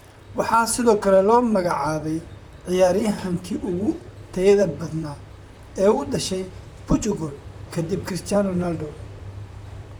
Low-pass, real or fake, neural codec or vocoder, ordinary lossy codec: none; fake; vocoder, 44.1 kHz, 128 mel bands, Pupu-Vocoder; none